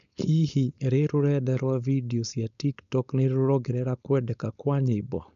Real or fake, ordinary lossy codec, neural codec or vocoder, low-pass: fake; none; codec, 16 kHz, 4.8 kbps, FACodec; 7.2 kHz